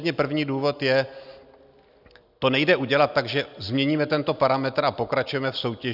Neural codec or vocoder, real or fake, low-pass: none; real; 5.4 kHz